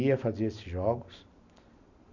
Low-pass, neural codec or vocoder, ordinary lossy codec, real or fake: 7.2 kHz; none; none; real